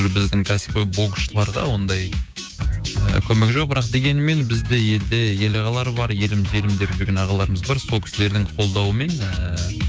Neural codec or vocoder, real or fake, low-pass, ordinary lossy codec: codec, 16 kHz, 6 kbps, DAC; fake; none; none